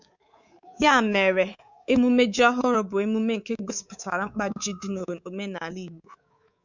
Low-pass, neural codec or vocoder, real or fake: 7.2 kHz; codec, 24 kHz, 3.1 kbps, DualCodec; fake